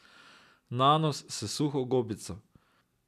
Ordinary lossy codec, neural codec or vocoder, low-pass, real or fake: none; none; 14.4 kHz; real